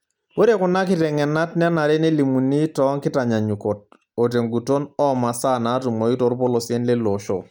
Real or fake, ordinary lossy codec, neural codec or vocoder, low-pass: real; none; none; 19.8 kHz